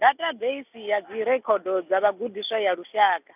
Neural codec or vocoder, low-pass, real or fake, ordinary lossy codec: none; 3.6 kHz; real; none